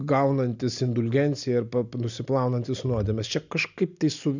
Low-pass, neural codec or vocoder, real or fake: 7.2 kHz; none; real